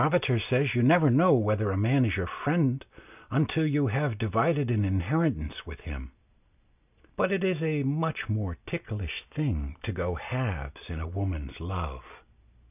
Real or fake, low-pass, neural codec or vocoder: real; 3.6 kHz; none